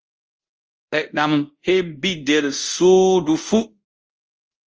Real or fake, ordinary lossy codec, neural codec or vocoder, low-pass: fake; Opus, 24 kbps; codec, 24 kHz, 0.5 kbps, DualCodec; 7.2 kHz